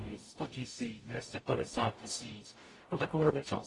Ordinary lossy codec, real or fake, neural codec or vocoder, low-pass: AAC, 32 kbps; fake; codec, 44.1 kHz, 0.9 kbps, DAC; 10.8 kHz